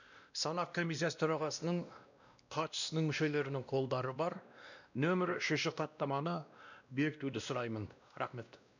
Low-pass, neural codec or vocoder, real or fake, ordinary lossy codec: 7.2 kHz; codec, 16 kHz, 1 kbps, X-Codec, WavLM features, trained on Multilingual LibriSpeech; fake; none